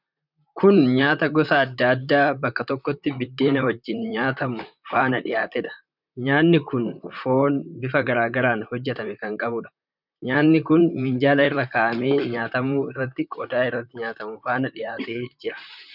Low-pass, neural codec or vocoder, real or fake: 5.4 kHz; vocoder, 44.1 kHz, 128 mel bands, Pupu-Vocoder; fake